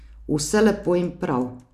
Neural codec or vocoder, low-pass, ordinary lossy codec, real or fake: none; 14.4 kHz; none; real